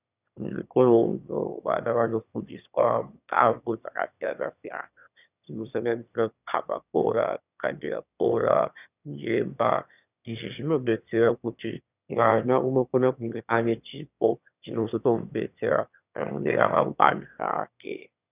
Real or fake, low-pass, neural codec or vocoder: fake; 3.6 kHz; autoencoder, 22.05 kHz, a latent of 192 numbers a frame, VITS, trained on one speaker